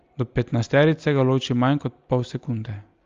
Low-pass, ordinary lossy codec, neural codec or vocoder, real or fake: 7.2 kHz; Opus, 32 kbps; none; real